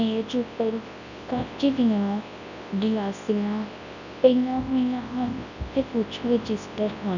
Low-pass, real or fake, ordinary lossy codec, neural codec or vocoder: 7.2 kHz; fake; none; codec, 24 kHz, 0.9 kbps, WavTokenizer, large speech release